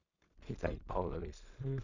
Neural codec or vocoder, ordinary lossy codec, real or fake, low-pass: codec, 16 kHz in and 24 kHz out, 0.4 kbps, LongCat-Audio-Codec, two codebook decoder; none; fake; 7.2 kHz